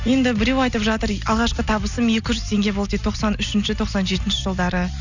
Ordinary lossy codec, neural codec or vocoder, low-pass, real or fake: none; none; 7.2 kHz; real